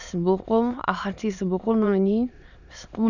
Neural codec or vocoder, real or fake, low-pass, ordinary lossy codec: autoencoder, 22.05 kHz, a latent of 192 numbers a frame, VITS, trained on many speakers; fake; 7.2 kHz; none